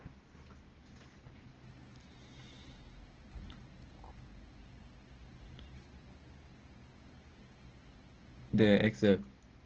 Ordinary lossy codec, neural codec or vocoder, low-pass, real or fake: Opus, 16 kbps; none; 7.2 kHz; real